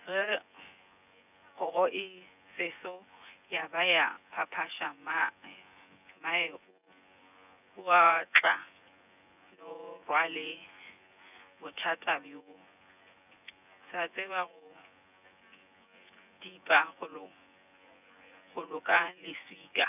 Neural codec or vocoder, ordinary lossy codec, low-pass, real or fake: vocoder, 24 kHz, 100 mel bands, Vocos; none; 3.6 kHz; fake